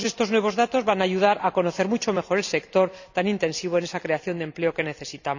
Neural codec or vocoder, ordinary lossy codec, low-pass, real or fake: vocoder, 44.1 kHz, 128 mel bands every 256 samples, BigVGAN v2; none; 7.2 kHz; fake